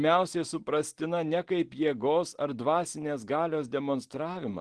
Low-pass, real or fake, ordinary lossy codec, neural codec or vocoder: 10.8 kHz; real; Opus, 16 kbps; none